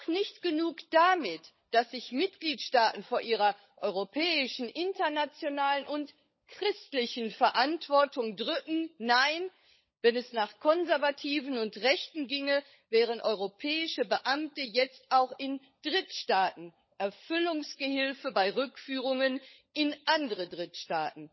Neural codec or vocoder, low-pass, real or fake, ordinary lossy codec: codec, 16 kHz, 16 kbps, FunCodec, trained on LibriTTS, 50 frames a second; 7.2 kHz; fake; MP3, 24 kbps